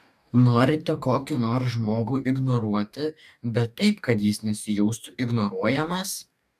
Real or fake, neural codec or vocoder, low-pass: fake; codec, 44.1 kHz, 2.6 kbps, DAC; 14.4 kHz